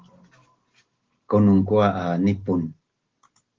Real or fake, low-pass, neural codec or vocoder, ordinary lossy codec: real; 7.2 kHz; none; Opus, 16 kbps